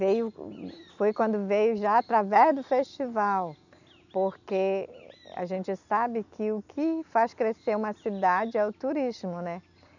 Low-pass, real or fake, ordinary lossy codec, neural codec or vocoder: 7.2 kHz; real; none; none